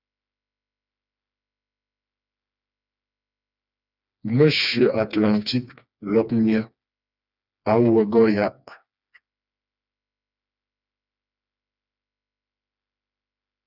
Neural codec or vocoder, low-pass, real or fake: codec, 16 kHz, 2 kbps, FreqCodec, smaller model; 5.4 kHz; fake